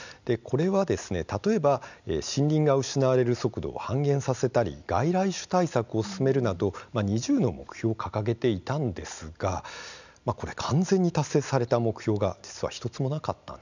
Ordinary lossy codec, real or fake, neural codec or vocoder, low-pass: none; real; none; 7.2 kHz